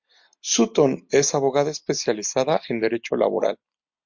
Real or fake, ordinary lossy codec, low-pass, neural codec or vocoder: real; MP3, 48 kbps; 7.2 kHz; none